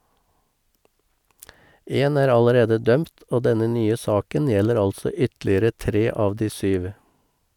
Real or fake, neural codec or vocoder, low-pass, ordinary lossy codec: real; none; 19.8 kHz; none